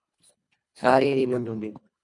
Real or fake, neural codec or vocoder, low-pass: fake; codec, 24 kHz, 1.5 kbps, HILCodec; 10.8 kHz